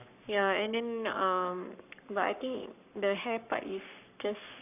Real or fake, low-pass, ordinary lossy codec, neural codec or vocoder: fake; 3.6 kHz; none; codec, 44.1 kHz, 7.8 kbps, Pupu-Codec